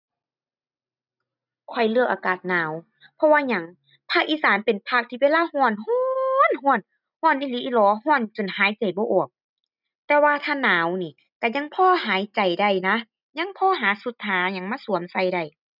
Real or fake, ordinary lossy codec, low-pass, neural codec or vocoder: real; none; 5.4 kHz; none